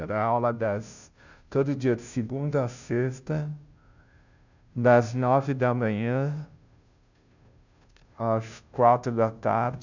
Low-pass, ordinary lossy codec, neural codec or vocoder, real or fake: 7.2 kHz; none; codec, 16 kHz, 0.5 kbps, FunCodec, trained on Chinese and English, 25 frames a second; fake